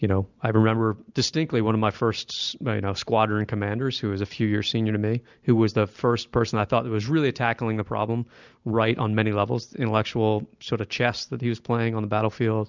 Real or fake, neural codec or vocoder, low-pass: real; none; 7.2 kHz